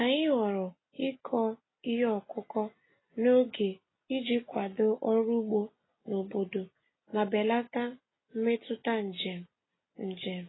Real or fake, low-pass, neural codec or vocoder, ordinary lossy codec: real; 7.2 kHz; none; AAC, 16 kbps